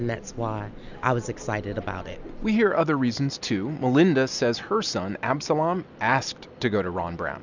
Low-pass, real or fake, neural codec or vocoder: 7.2 kHz; real; none